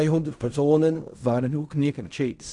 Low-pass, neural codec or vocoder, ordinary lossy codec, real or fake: 10.8 kHz; codec, 16 kHz in and 24 kHz out, 0.4 kbps, LongCat-Audio-Codec, fine tuned four codebook decoder; none; fake